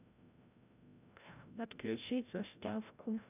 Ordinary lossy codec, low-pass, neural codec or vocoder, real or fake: none; 3.6 kHz; codec, 16 kHz, 0.5 kbps, FreqCodec, larger model; fake